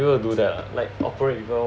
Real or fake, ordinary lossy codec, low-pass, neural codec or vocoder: real; none; none; none